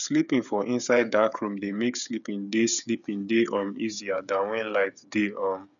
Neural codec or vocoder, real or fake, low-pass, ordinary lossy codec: codec, 16 kHz, 8 kbps, FreqCodec, smaller model; fake; 7.2 kHz; none